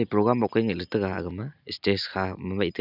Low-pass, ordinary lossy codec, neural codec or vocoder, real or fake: 5.4 kHz; none; none; real